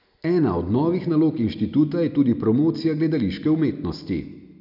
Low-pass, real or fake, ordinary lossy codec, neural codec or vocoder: 5.4 kHz; real; none; none